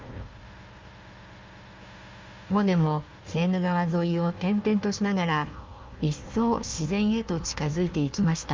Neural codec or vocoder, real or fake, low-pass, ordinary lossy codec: codec, 16 kHz, 1 kbps, FunCodec, trained on Chinese and English, 50 frames a second; fake; 7.2 kHz; Opus, 32 kbps